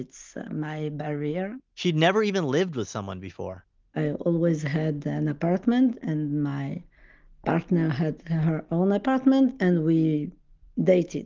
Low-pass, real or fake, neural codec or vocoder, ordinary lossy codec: 7.2 kHz; real; none; Opus, 32 kbps